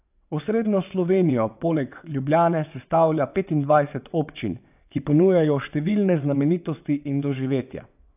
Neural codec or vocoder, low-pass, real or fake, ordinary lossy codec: vocoder, 22.05 kHz, 80 mel bands, WaveNeXt; 3.6 kHz; fake; AAC, 32 kbps